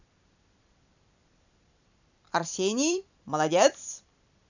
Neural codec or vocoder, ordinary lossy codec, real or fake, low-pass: vocoder, 44.1 kHz, 128 mel bands every 256 samples, BigVGAN v2; none; fake; 7.2 kHz